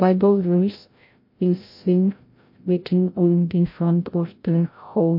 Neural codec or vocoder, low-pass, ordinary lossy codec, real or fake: codec, 16 kHz, 0.5 kbps, FreqCodec, larger model; 5.4 kHz; MP3, 32 kbps; fake